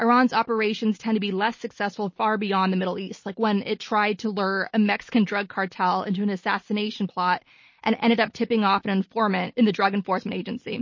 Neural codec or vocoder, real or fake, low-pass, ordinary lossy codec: none; real; 7.2 kHz; MP3, 32 kbps